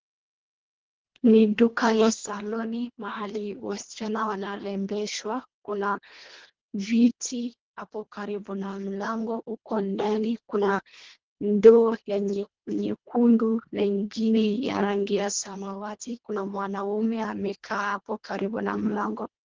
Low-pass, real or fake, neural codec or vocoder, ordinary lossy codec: 7.2 kHz; fake; codec, 24 kHz, 1.5 kbps, HILCodec; Opus, 16 kbps